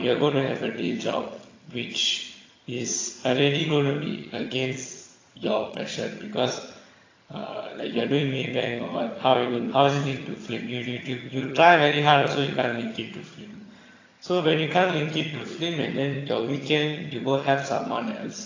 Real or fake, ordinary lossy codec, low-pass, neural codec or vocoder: fake; AAC, 32 kbps; 7.2 kHz; vocoder, 22.05 kHz, 80 mel bands, HiFi-GAN